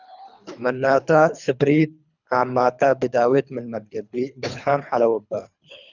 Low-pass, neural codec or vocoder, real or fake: 7.2 kHz; codec, 24 kHz, 3 kbps, HILCodec; fake